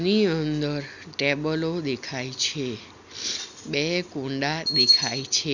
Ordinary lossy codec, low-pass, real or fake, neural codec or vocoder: none; 7.2 kHz; real; none